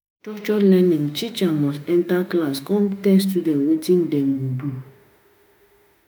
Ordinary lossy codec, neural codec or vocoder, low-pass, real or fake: none; autoencoder, 48 kHz, 32 numbers a frame, DAC-VAE, trained on Japanese speech; none; fake